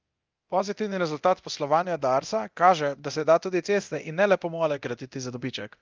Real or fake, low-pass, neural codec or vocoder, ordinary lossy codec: fake; 7.2 kHz; codec, 24 kHz, 0.9 kbps, DualCodec; Opus, 32 kbps